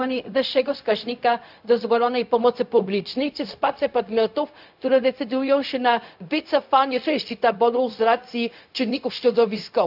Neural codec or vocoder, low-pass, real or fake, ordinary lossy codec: codec, 16 kHz, 0.4 kbps, LongCat-Audio-Codec; 5.4 kHz; fake; AAC, 48 kbps